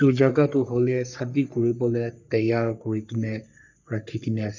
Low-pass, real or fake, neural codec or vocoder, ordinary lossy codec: 7.2 kHz; fake; codec, 44.1 kHz, 3.4 kbps, Pupu-Codec; none